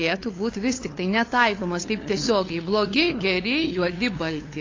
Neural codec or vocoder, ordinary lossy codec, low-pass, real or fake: codec, 16 kHz, 8 kbps, FunCodec, trained on LibriTTS, 25 frames a second; AAC, 32 kbps; 7.2 kHz; fake